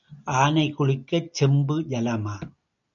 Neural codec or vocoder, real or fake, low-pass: none; real; 7.2 kHz